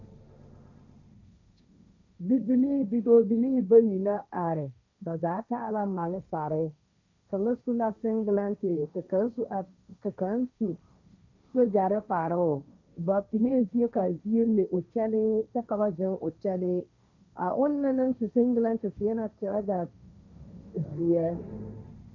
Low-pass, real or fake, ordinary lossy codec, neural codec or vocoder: 7.2 kHz; fake; MP3, 64 kbps; codec, 16 kHz, 1.1 kbps, Voila-Tokenizer